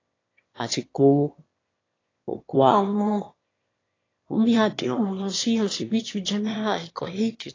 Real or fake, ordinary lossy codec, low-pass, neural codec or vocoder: fake; AAC, 32 kbps; 7.2 kHz; autoencoder, 22.05 kHz, a latent of 192 numbers a frame, VITS, trained on one speaker